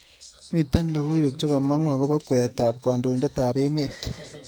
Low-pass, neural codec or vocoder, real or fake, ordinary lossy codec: none; codec, 44.1 kHz, 2.6 kbps, SNAC; fake; none